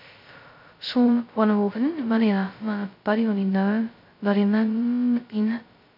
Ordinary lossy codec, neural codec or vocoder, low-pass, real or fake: MP3, 48 kbps; codec, 16 kHz, 0.2 kbps, FocalCodec; 5.4 kHz; fake